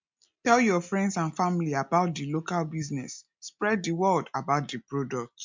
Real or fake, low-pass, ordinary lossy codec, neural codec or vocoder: real; 7.2 kHz; MP3, 64 kbps; none